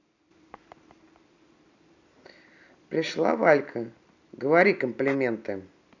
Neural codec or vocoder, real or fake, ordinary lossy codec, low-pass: none; real; none; 7.2 kHz